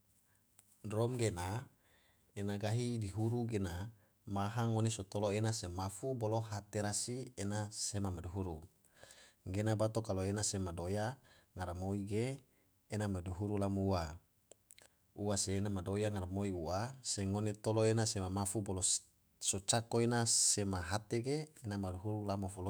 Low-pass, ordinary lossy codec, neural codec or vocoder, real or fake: none; none; autoencoder, 48 kHz, 128 numbers a frame, DAC-VAE, trained on Japanese speech; fake